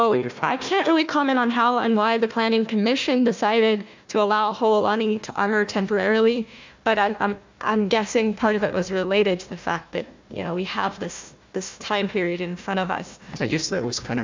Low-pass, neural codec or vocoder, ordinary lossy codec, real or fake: 7.2 kHz; codec, 16 kHz, 1 kbps, FunCodec, trained on Chinese and English, 50 frames a second; MP3, 64 kbps; fake